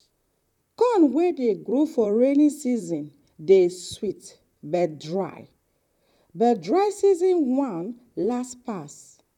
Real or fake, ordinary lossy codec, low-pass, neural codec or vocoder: fake; none; 19.8 kHz; vocoder, 44.1 kHz, 128 mel bands, Pupu-Vocoder